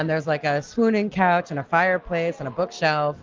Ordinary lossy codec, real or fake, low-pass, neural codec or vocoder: Opus, 32 kbps; real; 7.2 kHz; none